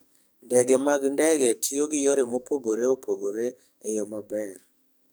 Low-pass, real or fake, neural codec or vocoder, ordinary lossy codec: none; fake; codec, 44.1 kHz, 2.6 kbps, SNAC; none